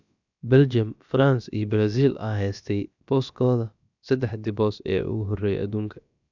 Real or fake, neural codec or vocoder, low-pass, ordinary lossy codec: fake; codec, 16 kHz, about 1 kbps, DyCAST, with the encoder's durations; 7.2 kHz; none